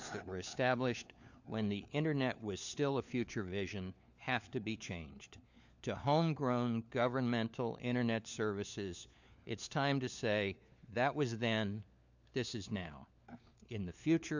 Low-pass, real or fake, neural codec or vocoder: 7.2 kHz; fake; codec, 16 kHz, 4 kbps, FunCodec, trained on LibriTTS, 50 frames a second